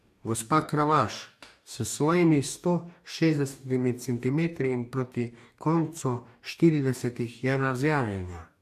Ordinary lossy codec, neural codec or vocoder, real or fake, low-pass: AAC, 96 kbps; codec, 44.1 kHz, 2.6 kbps, DAC; fake; 14.4 kHz